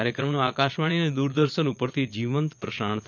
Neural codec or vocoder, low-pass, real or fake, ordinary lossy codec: vocoder, 22.05 kHz, 80 mel bands, Vocos; 7.2 kHz; fake; none